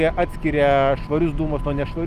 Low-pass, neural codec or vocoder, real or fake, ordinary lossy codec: 14.4 kHz; none; real; Opus, 32 kbps